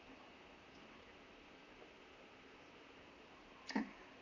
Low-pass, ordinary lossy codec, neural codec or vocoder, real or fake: 7.2 kHz; none; vocoder, 44.1 kHz, 128 mel bands, Pupu-Vocoder; fake